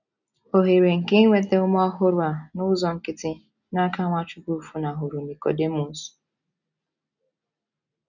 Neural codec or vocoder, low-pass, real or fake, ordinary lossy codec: none; 7.2 kHz; real; none